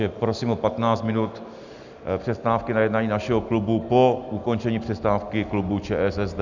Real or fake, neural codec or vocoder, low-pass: real; none; 7.2 kHz